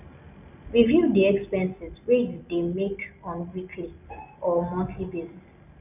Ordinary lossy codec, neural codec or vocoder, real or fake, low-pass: none; none; real; 3.6 kHz